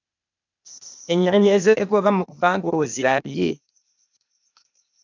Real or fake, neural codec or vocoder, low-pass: fake; codec, 16 kHz, 0.8 kbps, ZipCodec; 7.2 kHz